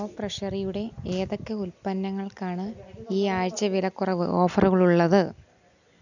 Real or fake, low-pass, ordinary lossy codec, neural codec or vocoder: real; 7.2 kHz; none; none